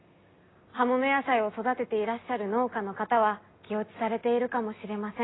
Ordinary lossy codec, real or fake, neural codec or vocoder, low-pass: AAC, 16 kbps; real; none; 7.2 kHz